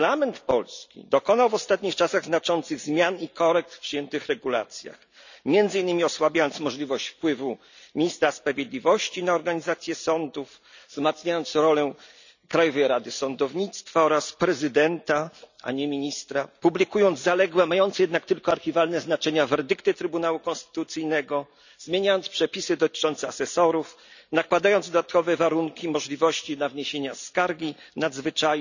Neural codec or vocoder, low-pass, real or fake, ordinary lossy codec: none; 7.2 kHz; real; none